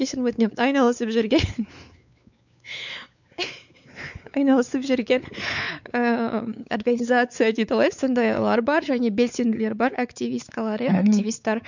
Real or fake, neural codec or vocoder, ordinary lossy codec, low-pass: fake; codec, 16 kHz, 4 kbps, X-Codec, WavLM features, trained on Multilingual LibriSpeech; none; 7.2 kHz